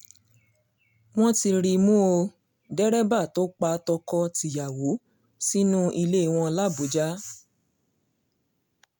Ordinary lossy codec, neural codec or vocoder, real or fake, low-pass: none; none; real; none